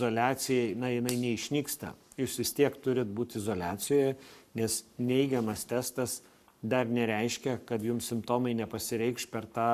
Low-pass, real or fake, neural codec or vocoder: 14.4 kHz; fake; codec, 44.1 kHz, 7.8 kbps, Pupu-Codec